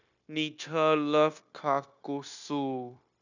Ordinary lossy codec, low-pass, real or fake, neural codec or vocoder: none; 7.2 kHz; fake; codec, 16 kHz, 0.9 kbps, LongCat-Audio-Codec